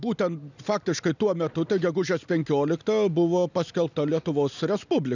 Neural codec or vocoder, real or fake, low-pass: none; real; 7.2 kHz